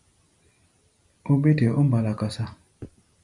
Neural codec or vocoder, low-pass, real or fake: none; 10.8 kHz; real